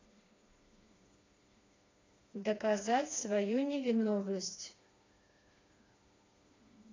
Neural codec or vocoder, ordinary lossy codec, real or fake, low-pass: codec, 16 kHz, 2 kbps, FreqCodec, smaller model; AAC, 32 kbps; fake; 7.2 kHz